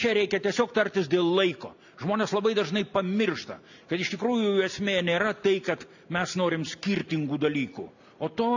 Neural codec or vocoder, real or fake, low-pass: none; real; 7.2 kHz